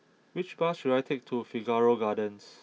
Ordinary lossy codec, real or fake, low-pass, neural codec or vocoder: none; real; none; none